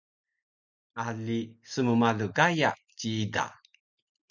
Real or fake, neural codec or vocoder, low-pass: real; none; 7.2 kHz